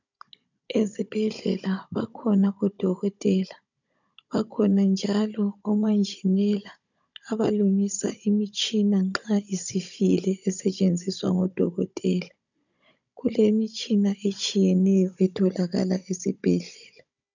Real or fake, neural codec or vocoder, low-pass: fake; codec, 16 kHz, 16 kbps, FunCodec, trained on Chinese and English, 50 frames a second; 7.2 kHz